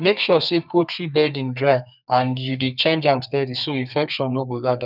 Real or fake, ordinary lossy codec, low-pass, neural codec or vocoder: fake; none; 5.4 kHz; codec, 32 kHz, 1.9 kbps, SNAC